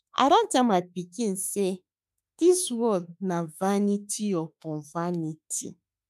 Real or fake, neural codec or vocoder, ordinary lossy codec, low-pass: fake; autoencoder, 48 kHz, 32 numbers a frame, DAC-VAE, trained on Japanese speech; none; 14.4 kHz